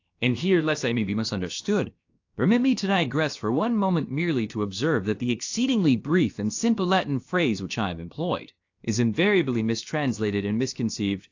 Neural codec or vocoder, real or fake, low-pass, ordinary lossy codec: codec, 16 kHz, about 1 kbps, DyCAST, with the encoder's durations; fake; 7.2 kHz; AAC, 48 kbps